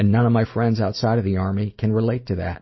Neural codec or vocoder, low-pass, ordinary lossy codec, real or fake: none; 7.2 kHz; MP3, 24 kbps; real